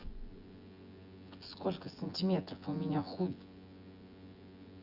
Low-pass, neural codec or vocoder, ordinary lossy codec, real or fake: 5.4 kHz; vocoder, 24 kHz, 100 mel bands, Vocos; Opus, 64 kbps; fake